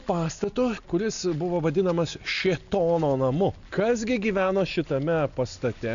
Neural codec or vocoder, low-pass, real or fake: none; 7.2 kHz; real